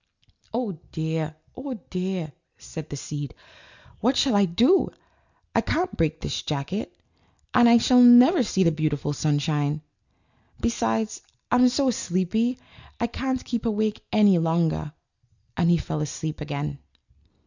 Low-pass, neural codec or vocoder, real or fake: 7.2 kHz; none; real